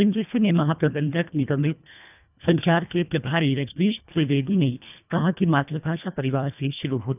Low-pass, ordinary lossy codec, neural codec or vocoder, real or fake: 3.6 kHz; none; codec, 24 kHz, 1.5 kbps, HILCodec; fake